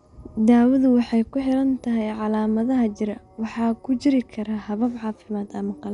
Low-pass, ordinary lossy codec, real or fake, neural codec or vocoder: 10.8 kHz; none; real; none